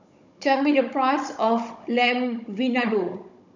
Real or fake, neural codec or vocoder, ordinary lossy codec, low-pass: fake; codec, 16 kHz, 16 kbps, FunCodec, trained on Chinese and English, 50 frames a second; none; 7.2 kHz